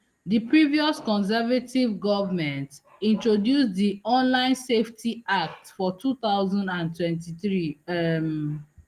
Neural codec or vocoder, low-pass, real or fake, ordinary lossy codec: none; 14.4 kHz; real; Opus, 24 kbps